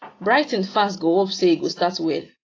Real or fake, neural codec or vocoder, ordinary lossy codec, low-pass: real; none; AAC, 32 kbps; 7.2 kHz